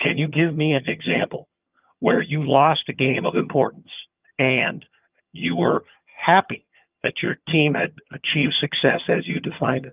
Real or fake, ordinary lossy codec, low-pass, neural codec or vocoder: fake; Opus, 24 kbps; 3.6 kHz; vocoder, 22.05 kHz, 80 mel bands, HiFi-GAN